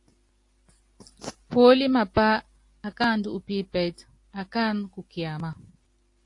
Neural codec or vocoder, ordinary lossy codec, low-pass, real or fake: none; AAC, 48 kbps; 10.8 kHz; real